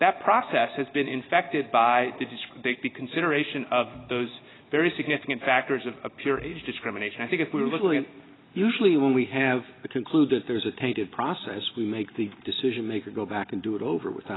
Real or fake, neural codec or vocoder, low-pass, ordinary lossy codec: real; none; 7.2 kHz; AAC, 16 kbps